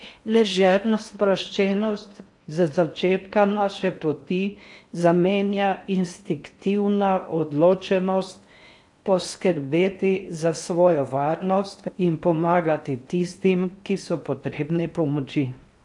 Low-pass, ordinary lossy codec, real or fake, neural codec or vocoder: 10.8 kHz; AAC, 64 kbps; fake; codec, 16 kHz in and 24 kHz out, 0.6 kbps, FocalCodec, streaming, 4096 codes